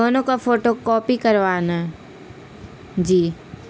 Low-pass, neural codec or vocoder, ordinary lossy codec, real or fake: none; none; none; real